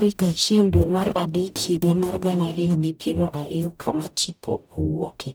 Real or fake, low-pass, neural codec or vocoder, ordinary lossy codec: fake; none; codec, 44.1 kHz, 0.9 kbps, DAC; none